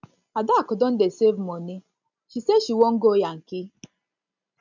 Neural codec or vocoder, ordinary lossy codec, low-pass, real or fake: none; none; 7.2 kHz; real